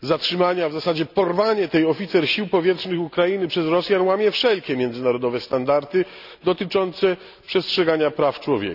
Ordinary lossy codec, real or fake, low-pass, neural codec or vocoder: none; real; 5.4 kHz; none